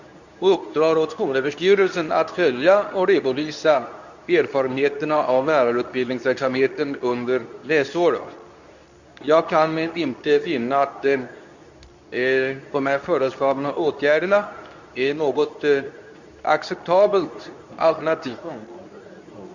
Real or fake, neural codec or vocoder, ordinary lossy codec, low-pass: fake; codec, 24 kHz, 0.9 kbps, WavTokenizer, medium speech release version 2; none; 7.2 kHz